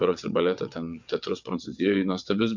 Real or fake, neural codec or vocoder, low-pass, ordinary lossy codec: fake; vocoder, 22.05 kHz, 80 mel bands, WaveNeXt; 7.2 kHz; MP3, 64 kbps